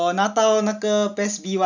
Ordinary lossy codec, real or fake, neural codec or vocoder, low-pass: none; real; none; 7.2 kHz